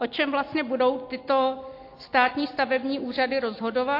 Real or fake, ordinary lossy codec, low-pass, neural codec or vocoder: real; AAC, 32 kbps; 5.4 kHz; none